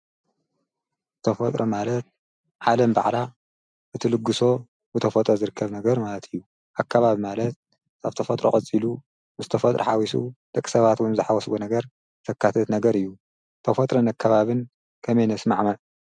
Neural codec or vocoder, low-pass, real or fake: none; 9.9 kHz; real